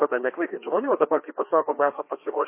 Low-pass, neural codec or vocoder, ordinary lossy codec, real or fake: 3.6 kHz; codec, 16 kHz, 2 kbps, FreqCodec, larger model; MP3, 24 kbps; fake